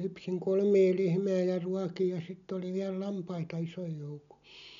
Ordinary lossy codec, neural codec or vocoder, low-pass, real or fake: none; none; 7.2 kHz; real